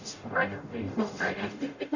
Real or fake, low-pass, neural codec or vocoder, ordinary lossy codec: fake; 7.2 kHz; codec, 44.1 kHz, 0.9 kbps, DAC; none